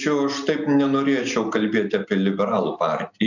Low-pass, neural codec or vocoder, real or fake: 7.2 kHz; none; real